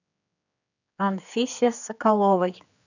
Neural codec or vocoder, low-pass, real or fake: codec, 16 kHz, 4 kbps, X-Codec, HuBERT features, trained on general audio; 7.2 kHz; fake